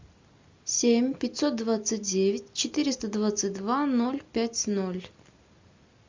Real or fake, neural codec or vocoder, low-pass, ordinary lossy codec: real; none; 7.2 kHz; MP3, 64 kbps